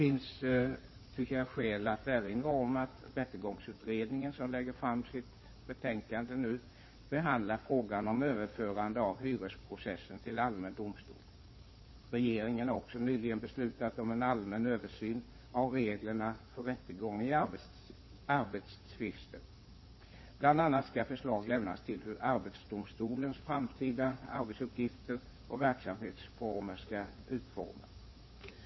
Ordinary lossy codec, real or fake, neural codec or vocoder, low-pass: MP3, 24 kbps; fake; codec, 16 kHz in and 24 kHz out, 2.2 kbps, FireRedTTS-2 codec; 7.2 kHz